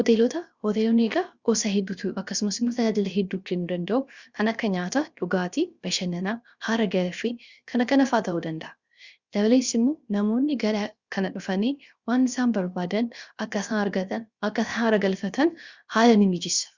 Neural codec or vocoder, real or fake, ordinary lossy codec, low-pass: codec, 16 kHz, 0.7 kbps, FocalCodec; fake; Opus, 64 kbps; 7.2 kHz